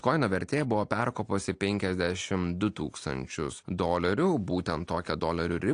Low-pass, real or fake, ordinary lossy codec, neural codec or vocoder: 9.9 kHz; real; AAC, 48 kbps; none